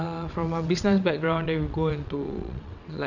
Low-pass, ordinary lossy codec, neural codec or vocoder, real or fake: 7.2 kHz; none; vocoder, 22.05 kHz, 80 mel bands, WaveNeXt; fake